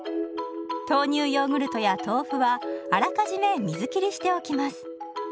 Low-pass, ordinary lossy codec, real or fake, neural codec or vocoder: none; none; real; none